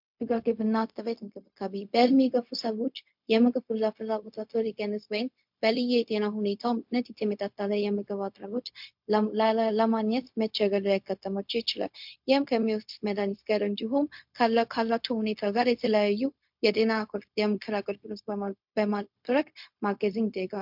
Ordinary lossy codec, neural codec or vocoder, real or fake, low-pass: MP3, 48 kbps; codec, 16 kHz, 0.4 kbps, LongCat-Audio-Codec; fake; 5.4 kHz